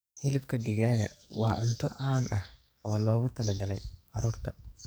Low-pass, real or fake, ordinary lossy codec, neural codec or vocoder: none; fake; none; codec, 44.1 kHz, 2.6 kbps, SNAC